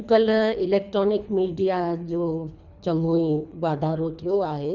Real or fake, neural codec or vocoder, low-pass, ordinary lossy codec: fake; codec, 24 kHz, 3 kbps, HILCodec; 7.2 kHz; none